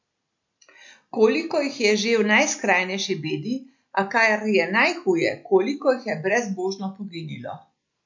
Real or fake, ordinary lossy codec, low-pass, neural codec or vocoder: real; MP3, 48 kbps; 7.2 kHz; none